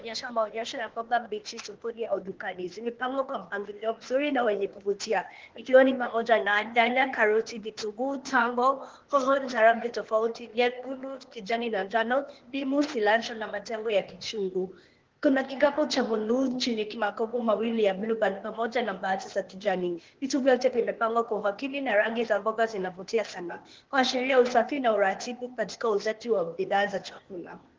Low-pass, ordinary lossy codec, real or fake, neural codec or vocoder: 7.2 kHz; Opus, 16 kbps; fake; codec, 16 kHz, 0.8 kbps, ZipCodec